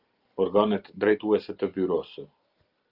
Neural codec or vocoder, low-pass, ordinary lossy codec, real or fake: none; 5.4 kHz; Opus, 24 kbps; real